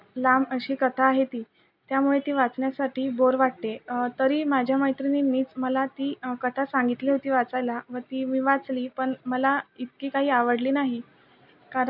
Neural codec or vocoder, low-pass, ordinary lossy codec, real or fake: none; 5.4 kHz; none; real